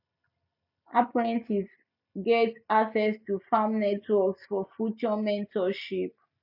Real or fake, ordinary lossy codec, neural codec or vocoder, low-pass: real; none; none; 5.4 kHz